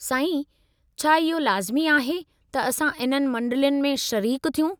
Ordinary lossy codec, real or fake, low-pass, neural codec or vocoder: none; real; none; none